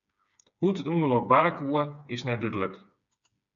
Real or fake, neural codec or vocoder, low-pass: fake; codec, 16 kHz, 4 kbps, FreqCodec, smaller model; 7.2 kHz